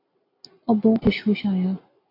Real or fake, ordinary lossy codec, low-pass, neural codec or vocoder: real; AAC, 24 kbps; 5.4 kHz; none